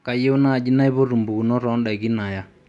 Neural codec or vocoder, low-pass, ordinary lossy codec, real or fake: none; 10.8 kHz; none; real